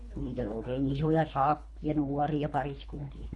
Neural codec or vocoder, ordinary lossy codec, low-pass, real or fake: codec, 24 kHz, 3 kbps, HILCodec; none; 10.8 kHz; fake